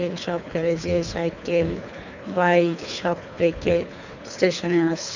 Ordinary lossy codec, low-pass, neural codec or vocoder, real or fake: none; 7.2 kHz; codec, 24 kHz, 3 kbps, HILCodec; fake